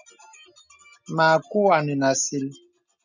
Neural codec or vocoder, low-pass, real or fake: none; 7.2 kHz; real